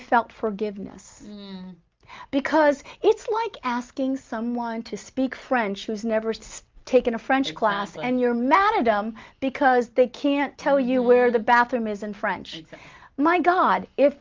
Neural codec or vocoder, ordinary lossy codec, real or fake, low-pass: none; Opus, 32 kbps; real; 7.2 kHz